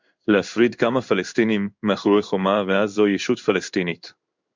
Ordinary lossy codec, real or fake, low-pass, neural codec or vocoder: MP3, 64 kbps; fake; 7.2 kHz; codec, 16 kHz in and 24 kHz out, 1 kbps, XY-Tokenizer